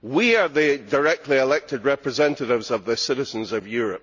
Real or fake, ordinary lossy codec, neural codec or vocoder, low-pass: real; none; none; 7.2 kHz